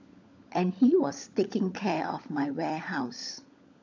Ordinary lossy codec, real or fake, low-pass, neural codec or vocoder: AAC, 48 kbps; fake; 7.2 kHz; codec, 16 kHz, 16 kbps, FunCodec, trained on LibriTTS, 50 frames a second